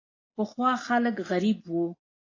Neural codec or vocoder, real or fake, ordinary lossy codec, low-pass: none; real; AAC, 32 kbps; 7.2 kHz